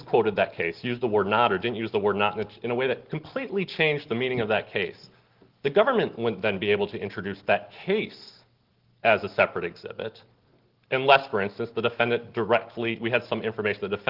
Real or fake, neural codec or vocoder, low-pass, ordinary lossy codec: real; none; 5.4 kHz; Opus, 16 kbps